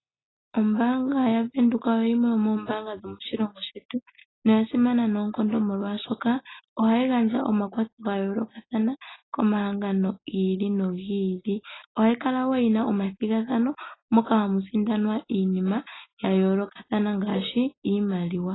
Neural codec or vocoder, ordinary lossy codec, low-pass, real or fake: none; AAC, 16 kbps; 7.2 kHz; real